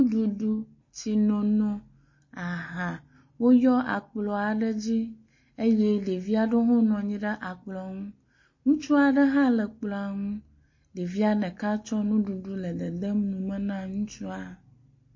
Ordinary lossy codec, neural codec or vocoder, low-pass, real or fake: MP3, 32 kbps; none; 7.2 kHz; real